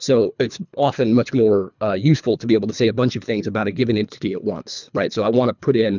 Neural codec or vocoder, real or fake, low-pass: codec, 24 kHz, 3 kbps, HILCodec; fake; 7.2 kHz